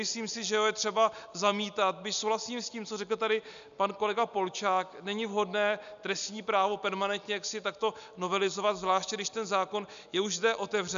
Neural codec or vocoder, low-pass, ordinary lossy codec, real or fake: none; 7.2 kHz; AAC, 96 kbps; real